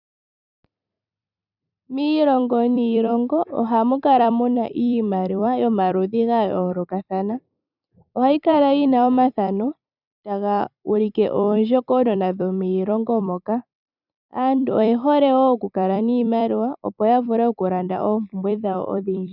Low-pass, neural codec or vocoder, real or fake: 5.4 kHz; vocoder, 44.1 kHz, 128 mel bands every 256 samples, BigVGAN v2; fake